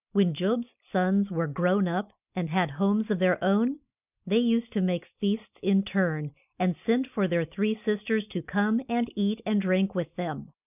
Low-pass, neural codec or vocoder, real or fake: 3.6 kHz; none; real